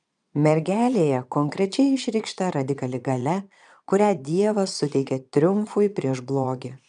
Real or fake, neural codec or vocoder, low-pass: fake; vocoder, 22.05 kHz, 80 mel bands, Vocos; 9.9 kHz